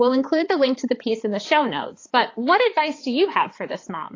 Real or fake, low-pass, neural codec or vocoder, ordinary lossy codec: fake; 7.2 kHz; codec, 16 kHz, 4 kbps, X-Codec, HuBERT features, trained on balanced general audio; AAC, 32 kbps